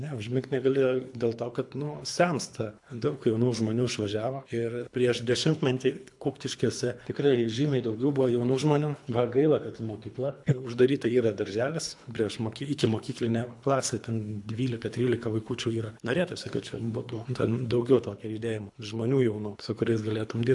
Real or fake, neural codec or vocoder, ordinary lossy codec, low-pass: fake; codec, 24 kHz, 3 kbps, HILCodec; AAC, 64 kbps; 10.8 kHz